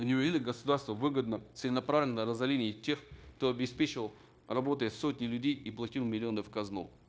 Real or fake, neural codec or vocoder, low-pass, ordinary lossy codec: fake; codec, 16 kHz, 0.9 kbps, LongCat-Audio-Codec; none; none